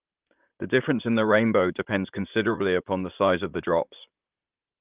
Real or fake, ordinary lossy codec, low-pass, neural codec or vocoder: fake; Opus, 32 kbps; 3.6 kHz; vocoder, 44.1 kHz, 128 mel bands, Pupu-Vocoder